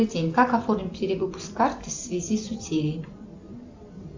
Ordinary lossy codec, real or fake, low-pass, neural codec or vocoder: AAC, 48 kbps; real; 7.2 kHz; none